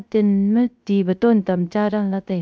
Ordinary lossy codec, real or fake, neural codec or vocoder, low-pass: none; fake; codec, 16 kHz, 0.3 kbps, FocalCodec; none